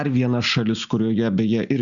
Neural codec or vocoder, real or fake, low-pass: none; real; 7.2 kHz